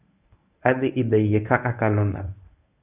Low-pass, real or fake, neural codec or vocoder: 3.6 kHz; fake; codec, 24 kHz, 0.9 kbps, WavTokenizer, medium speech release version 1